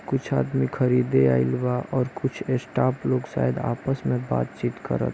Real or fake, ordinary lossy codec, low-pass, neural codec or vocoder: real; none; none; none